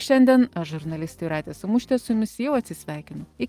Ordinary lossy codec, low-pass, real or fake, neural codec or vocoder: Opus, 24 kbps; 14.4 kHz; real; none